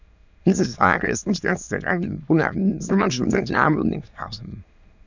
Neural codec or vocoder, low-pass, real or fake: autoencoder, 22.05 kHz, a latent of 192 numbers a frame, VITS, trained on many speakers; 7.2 kHz; fake